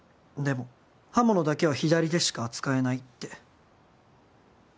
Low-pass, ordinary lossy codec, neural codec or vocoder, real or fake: none; none; none; real